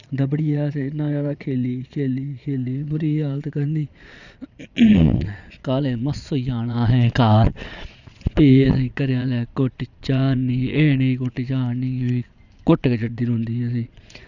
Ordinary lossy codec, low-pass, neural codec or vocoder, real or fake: none; 7.2 kHz; vocoder, 22.05 kHz, 80 mel bands, Vocos; fake